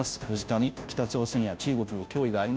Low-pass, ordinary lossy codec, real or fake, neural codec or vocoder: none; none; fake; codec, 16 kHz, 0.5 kbps, FunCodec, trained on Chinese and English, 25 frames a second